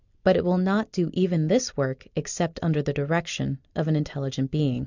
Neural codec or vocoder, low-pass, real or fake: none; 7.2 kHz; real